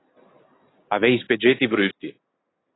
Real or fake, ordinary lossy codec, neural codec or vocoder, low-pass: real; AAC, 16 kbps; none; 7.2 kHz